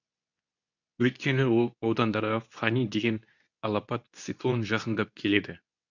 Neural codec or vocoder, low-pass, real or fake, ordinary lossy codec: codec, 24 kHz, 0.9 kbps, WavTokenizer, medium speech release version 2; 7.2 kHz; fake; none